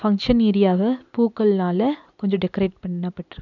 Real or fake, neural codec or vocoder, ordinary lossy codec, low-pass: real; none; none; 7.2 kHz